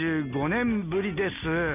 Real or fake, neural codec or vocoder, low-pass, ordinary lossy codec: real; none; 3.6 kHz; none